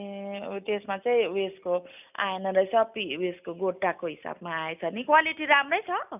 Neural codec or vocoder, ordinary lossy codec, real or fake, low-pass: none; none; real; 3.6 kHz